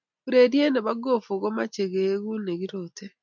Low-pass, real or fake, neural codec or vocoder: 7.2 kHz; real; none